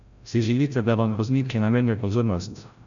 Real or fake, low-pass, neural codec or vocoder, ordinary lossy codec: fake; 7.2 kHz; codec, 16 kHz, 0.5 kbps, FreqCodec, larger model; none